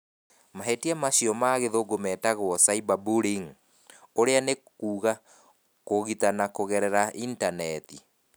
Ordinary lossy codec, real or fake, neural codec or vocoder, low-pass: none; real; none; none